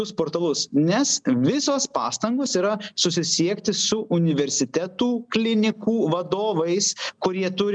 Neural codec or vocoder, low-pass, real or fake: none; 9.9 kHz; real